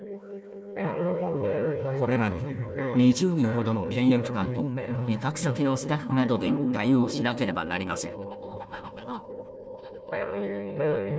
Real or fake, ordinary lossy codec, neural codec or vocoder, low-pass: fake; none; codec, 16 kHz, 1 kbps, FunCodec, trained on Chinese and English, 50 frames a second; none